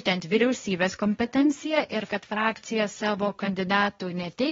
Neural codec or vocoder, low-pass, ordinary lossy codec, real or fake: codec, 16 kHz, 1.1 kbps, Voila-Tokenizer; 7.2 kHz; AAC, 24 kbps; fake